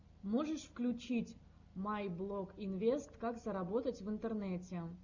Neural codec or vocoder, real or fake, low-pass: none; real; 7.2 kHz